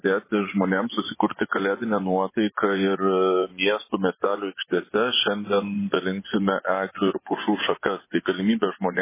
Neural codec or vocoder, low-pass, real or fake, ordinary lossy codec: vocoder, 24 kHz, 100 mel bands, Vocos; 3.6 kHz; fake; MP3, 16 kbps